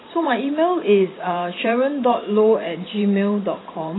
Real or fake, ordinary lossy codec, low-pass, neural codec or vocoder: fake; AAC, 16 kbps; 7.2 kHz; vocoder, 44.1 kHz, 128 mel bands every 512 samples, BigVGAN v2